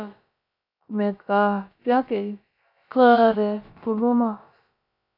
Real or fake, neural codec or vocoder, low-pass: fake; codec, 16 kHz, about 1 kbps, DyCAST, with the encoder's durations; 5.4 kHz